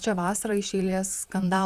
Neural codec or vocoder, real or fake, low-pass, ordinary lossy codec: vocoder, 44.1 kHz, 128 mel bands, Pupu-Vocoder; fake; 14.4 kHz; Opus, 64 kbps